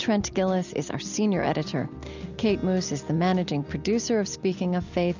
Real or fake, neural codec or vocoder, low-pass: real; none; 7.2 kHz